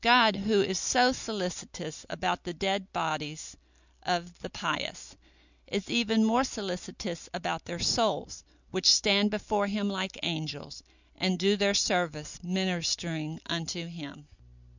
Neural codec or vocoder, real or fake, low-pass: none; real; 7.2 kHz